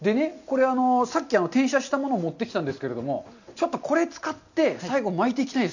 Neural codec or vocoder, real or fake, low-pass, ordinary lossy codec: none; real; 7.2 kHz; none